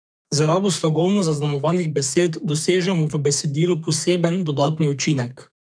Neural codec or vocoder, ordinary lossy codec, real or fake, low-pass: codec, 32 kHz, 1.9 kbps, SNAC; none; fake; 9.9 kHz